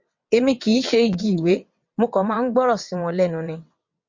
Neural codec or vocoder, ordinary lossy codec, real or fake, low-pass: vocoder, 22.05 kHz, 80 mel bands, WaveNeXt; MP3, 48 kbps; fake; 7.2 kHz